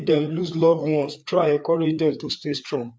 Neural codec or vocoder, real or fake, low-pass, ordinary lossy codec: codec, 16 kHz, 4 kbps, FreqCodec, larger model; fake; none; none